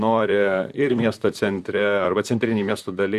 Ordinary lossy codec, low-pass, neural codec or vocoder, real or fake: Opus, 64 kbps; 14.4 kHz; vocoder, 44.1 kHz, 128 mel bands, Pupu-Vocoder; fake